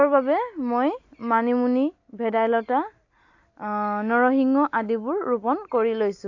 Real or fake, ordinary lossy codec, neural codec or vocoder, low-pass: real; AAC, 48 kbps; none; 7.2 kHz